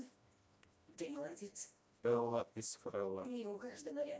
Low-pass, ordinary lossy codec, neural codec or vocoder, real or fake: none; none; codec, 16 kHz, 1 kbps, FreqCodec, smaller model; fake